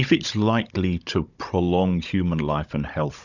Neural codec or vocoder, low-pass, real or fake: codec, 16 kHz, 16 kbps, FunCodec, trained on Chinese and English, 50 frames a second; 7.2 kHz; fake